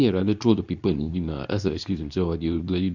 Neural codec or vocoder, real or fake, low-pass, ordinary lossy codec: codec, 24 kHz, 0.9 kbps, WavTokenizer, medium speech release version 2; fake; 7.2 kHz; none